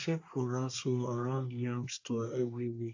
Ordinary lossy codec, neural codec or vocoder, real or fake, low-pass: AAC, 48 kbps; codec, 24 kHz, 1 kbps, SNAC; fake; 7.2 kHz